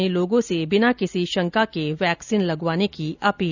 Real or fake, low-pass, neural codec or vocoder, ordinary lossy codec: real; 7.2 kHz; none; none